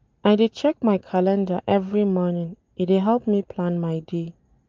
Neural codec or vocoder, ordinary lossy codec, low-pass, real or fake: none; Opus, 24 kbps; 7.2 kHz; real